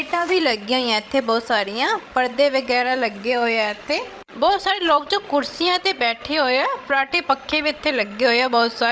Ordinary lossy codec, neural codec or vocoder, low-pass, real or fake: none; codec, 16 kHz, 16 kbps, FreqCodec, larger model; none; fake